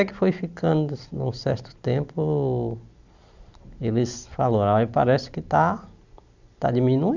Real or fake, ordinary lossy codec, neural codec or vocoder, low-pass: real; none; none; 7.2 kHz